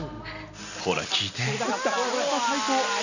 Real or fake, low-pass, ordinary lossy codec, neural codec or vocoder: real; 7.2 kHz; none; none